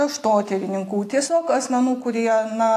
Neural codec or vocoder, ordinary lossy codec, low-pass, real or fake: none; AAC, 64 kbps; 14.4 kHz; real